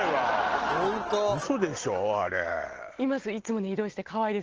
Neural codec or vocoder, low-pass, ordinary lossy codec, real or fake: none; 7.2 kHz; Opus, 16 kbps; real